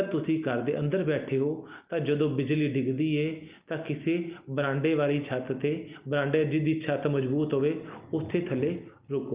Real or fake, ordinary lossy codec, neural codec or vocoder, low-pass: real; Opus, 24 kbps; none; 3.6 kHz